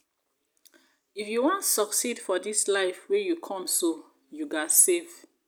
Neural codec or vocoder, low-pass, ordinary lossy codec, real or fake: none; none; none; real